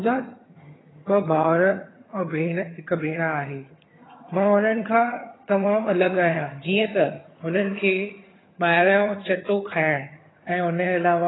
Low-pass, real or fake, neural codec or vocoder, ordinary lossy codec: 7.2 kHz; fake; vocoder, 22.05 kHz, 80 mel bands, HiFi-GAN; AAC, 16 kbps